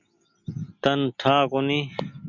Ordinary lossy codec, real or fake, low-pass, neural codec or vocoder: MP3, 48 kbps; real; 7.2 kHz; none